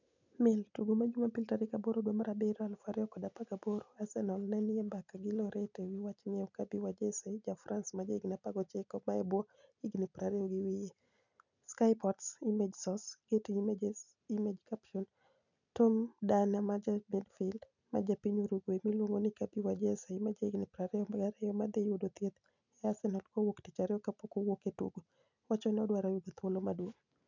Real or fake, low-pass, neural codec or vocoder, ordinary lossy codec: real; 7.2 kHz; none; none